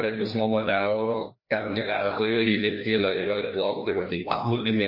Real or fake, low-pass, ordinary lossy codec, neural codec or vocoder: fake; 5.4 kHz; MP3, 32 kbps; codec, 16 kHz, 1 kbps, FreqCodec, larger model